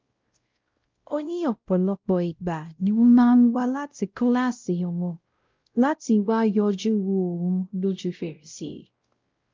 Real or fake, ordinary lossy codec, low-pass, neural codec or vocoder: fake; Opus, 24 kbps; 7.2 kHz; codec, 16 kHz, 0.5 kbps, X-Codec, WavLM features, trained on Multilingual LibriSpeech